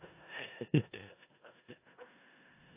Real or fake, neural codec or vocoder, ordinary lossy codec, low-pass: fake; codec, 16 kHz in and 24 kHz out, 0.4 kbps, LongCat-Audio-Codec, four codebook decoder; AAC, 32 kbps; 3.6 kHz